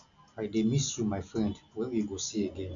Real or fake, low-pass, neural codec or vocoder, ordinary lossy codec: real; 7.2 kHz; none; none